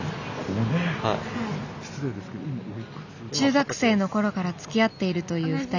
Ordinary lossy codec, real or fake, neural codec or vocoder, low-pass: none; real; none; 7.2 kHz